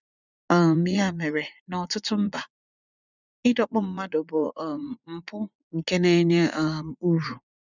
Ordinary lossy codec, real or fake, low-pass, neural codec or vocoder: none; fake; 7.2 kHz; vocoder, 44.1 kHz, 80 mel bands, Vocos